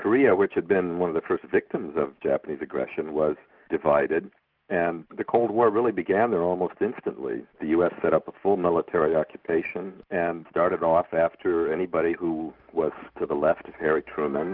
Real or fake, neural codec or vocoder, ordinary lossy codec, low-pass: real; none; Opus, 32 kbps; 5.4 kHz